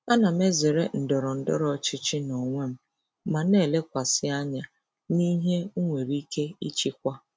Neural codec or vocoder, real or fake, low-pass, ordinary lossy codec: none; real; none; none